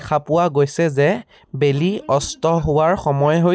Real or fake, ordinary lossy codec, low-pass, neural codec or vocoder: real; none; none; none